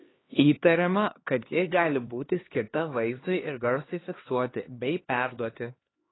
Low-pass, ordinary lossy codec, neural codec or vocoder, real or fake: 7.2 kHz; AAC, 16 kbps; codec, 16 kHz, 2 kbps, X-Codec, HuBERT features, trained on LibriSpeech; fake